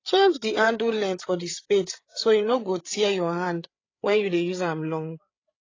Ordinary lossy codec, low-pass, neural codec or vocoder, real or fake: AAC, 32 kbps; 7.2 kHz; codec, 16 kHz, 8 kbps, FreqCodec, larger model; fake